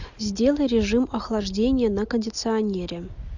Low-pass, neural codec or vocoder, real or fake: 7.2 kHz; none; real